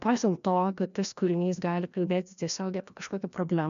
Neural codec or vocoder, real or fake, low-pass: codec, 16 kHz, 1 kbps, FreqCodec, larger model; fake; 7.2 kHz